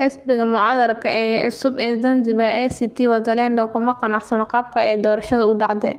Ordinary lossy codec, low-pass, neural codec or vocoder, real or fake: Opus, 32 kbps; 14.4 kHz; codec, 32 kHz, 1.9 kbps, SNAC; fake